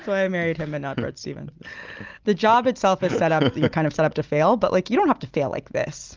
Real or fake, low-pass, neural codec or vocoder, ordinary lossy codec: real; 7.2 kHz; none; Opus, 24 kbps